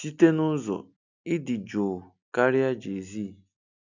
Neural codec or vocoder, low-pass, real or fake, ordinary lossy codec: none; 7.2 kHz; real; none